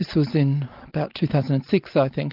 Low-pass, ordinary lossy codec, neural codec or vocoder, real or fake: 5.4 kHz; Opus, 32 kbps; none; real